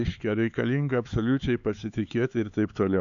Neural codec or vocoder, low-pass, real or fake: codec, 16 kHz, 4 kbps, X-Codec, HuBERT features, trained on LibriSpeech; 7.2 kHz; fake